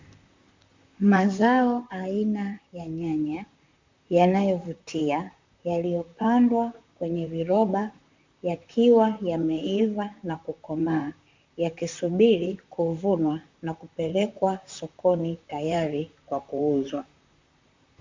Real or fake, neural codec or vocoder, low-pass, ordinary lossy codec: fake; vocoder, 44.1 kHz, 128 mel bands, Pupu-Vocoder; 7.2 kHz; MP3, 64 kbps